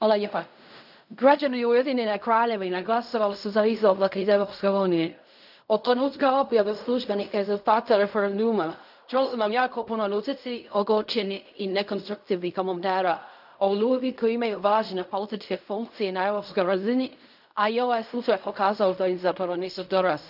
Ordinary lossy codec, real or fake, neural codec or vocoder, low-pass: none; fake; codec, 16 kHz in and 24 kHz out, 0.4 kbps, LongCat-Audio-Codec, fine tuned four codebook decoder; 5.4 kHz